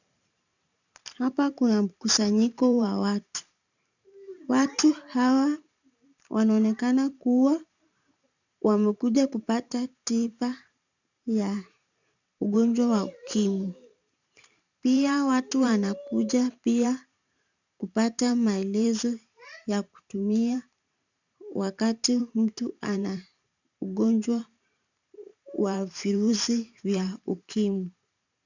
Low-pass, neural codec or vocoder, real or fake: 7.2 kHz; vocoder, 44.1 kHz, 128 mel bands every 512 samples, BigVGAN v2; fake